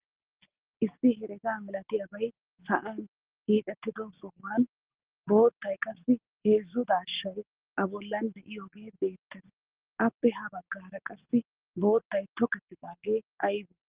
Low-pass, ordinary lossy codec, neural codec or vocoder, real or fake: 3.6 kHz; Opus, 16 kbps; none; real